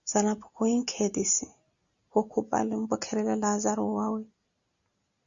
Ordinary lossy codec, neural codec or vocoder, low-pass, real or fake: Opus, 64 kbps; none; 7.2 kHz; real